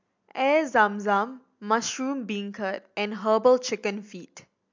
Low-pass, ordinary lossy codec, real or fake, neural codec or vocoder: 7.2 kHz; MP3, 64 kbps; real; none